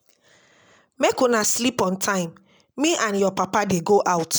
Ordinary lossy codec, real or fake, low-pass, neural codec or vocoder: none; real; none; none